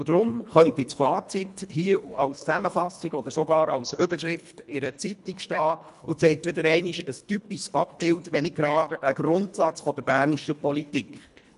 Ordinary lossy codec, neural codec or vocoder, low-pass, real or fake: none; codec, 24 kHz, 1.5 kbps, HILCodec; 10.8 kHz; fake